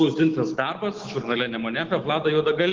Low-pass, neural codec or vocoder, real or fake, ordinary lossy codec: 7.2 kHz; autoencoder, 48 kHz, 128 numbers a frame, DAC-VAE, trained on Japanese speech; fake; Opus, 16 kbps